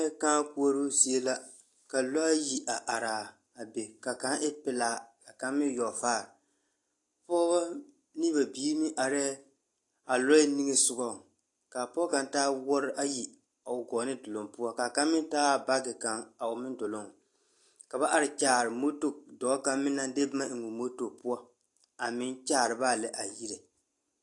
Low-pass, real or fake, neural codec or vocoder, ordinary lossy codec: 10.8 kHz; real; none; AAC, 48 kbps